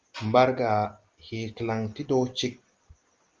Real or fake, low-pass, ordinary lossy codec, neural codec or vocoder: real; 7.2 kHz; Opus, 32 kbps; none